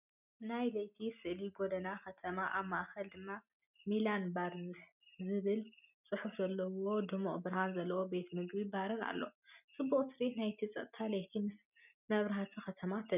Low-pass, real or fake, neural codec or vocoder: 3.6 kHz; real; none